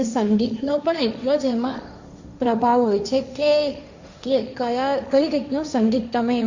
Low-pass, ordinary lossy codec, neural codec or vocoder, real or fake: 7.2 kHz; Opus, 64 kbps; codec, 16 kHz, 1.1 kbps, Voila-Tokenizer; fake